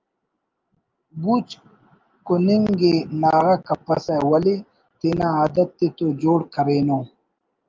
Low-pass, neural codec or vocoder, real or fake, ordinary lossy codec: 7.2 kHz; none; real; Opus, 24 kbps